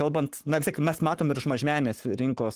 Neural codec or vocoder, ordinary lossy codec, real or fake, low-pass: codec, 44.1 kHz, 7.8 kbps, Pupu-Codec; Opus, 24 kbps; fake; 14.4 kHz